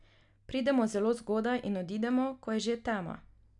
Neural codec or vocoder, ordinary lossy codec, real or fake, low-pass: none; none; real; 10.8 kHz